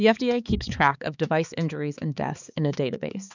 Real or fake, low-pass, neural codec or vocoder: fake; 7.2 kHz; codec, 16 kHz, 4 kbps, X-Codec, HuBERT features, trained on balanced general audio